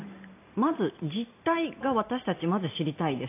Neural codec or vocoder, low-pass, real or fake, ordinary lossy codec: none; 3.6 kHz; real; AAC, 24 kbps